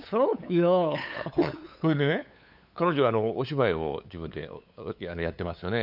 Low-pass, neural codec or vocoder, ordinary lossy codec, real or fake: 5.4 kHz; codec, 16 kHz, 8 kbps, FunCodec, trained on LibriTTS, 25 frames a second; none; fake